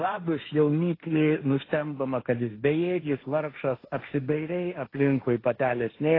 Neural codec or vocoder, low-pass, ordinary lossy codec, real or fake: codec, 16 kHz, 1.1 kbps, Voila-Tokenizer; 5.4 kHz; AAC, 24 kbps; fake